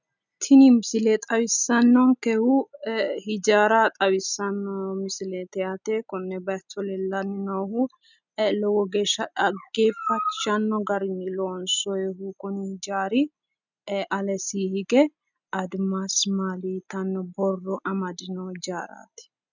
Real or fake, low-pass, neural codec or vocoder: real; 7.2 kHz; none